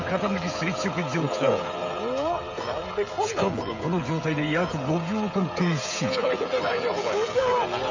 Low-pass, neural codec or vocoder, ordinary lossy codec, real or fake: 7.2 kHz; codec, 16 kHz, 16 kbps, FreqCodec, smaller model; MP3, 64 kbps; fake